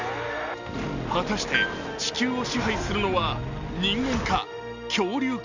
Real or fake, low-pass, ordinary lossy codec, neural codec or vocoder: real; 7.2 kHz; none; none